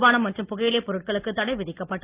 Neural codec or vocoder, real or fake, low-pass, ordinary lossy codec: none; real; 3.6 kHz; Opus, 24 kbps